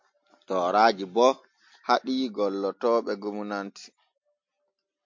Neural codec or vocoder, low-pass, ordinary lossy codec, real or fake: none; 7.2 kHz; MP3, 48 kbps; real